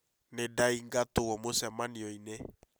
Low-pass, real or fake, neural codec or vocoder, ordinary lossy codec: none; real; none; none